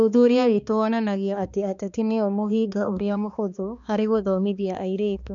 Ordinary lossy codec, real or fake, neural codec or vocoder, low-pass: none; fake; codec, 16 kHz, 2 kbps, X-Codec, HuBERT features, trained on balanced general audio; 7.2 kHz